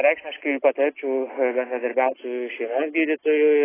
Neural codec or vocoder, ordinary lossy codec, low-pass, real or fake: none; AAC, 16 kbps; 3.6 kHz; real